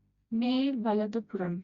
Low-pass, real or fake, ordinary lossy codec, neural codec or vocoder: 7.2 kHz; fake; Opus, 64 kbps; codec, 16 kHz, 1 kbps, FreqCodec, smaller model